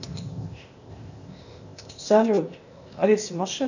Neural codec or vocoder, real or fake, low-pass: codec, 16 kHz, 0.8 kbps, ZipCodec; fake; 7.2 kHz